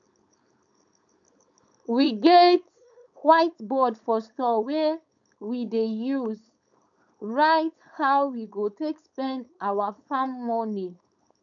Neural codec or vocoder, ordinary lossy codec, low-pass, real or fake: codec, 16 kHz, 4.8 kbps, FACodec; none; 7.2 kHz; fake